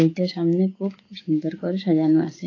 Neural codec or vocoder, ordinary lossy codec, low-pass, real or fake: codec, 16 kHz, 6 kbps, DAC; AAC, 32 kbps; 7.2 kHz; fake